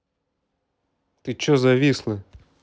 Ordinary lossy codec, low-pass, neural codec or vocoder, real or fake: none; none; none; real